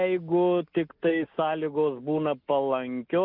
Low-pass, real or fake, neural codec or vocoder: 5.4 kHz; real; none